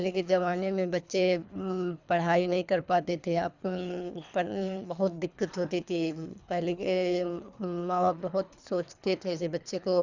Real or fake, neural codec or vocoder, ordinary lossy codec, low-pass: fake; codec, 24 kHz, 3 kbps, HILCodec; none; 7.2 kHz